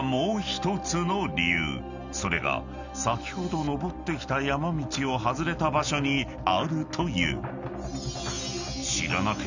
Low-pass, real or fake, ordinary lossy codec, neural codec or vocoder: 7.2 kHz; real; none; none